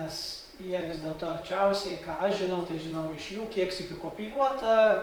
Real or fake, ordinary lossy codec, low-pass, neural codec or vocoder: fake; Opus, 64 kbps; 19.8 kHz; vocoder, 44.1 kHz, 128 mel bands, Pupu-Vocoder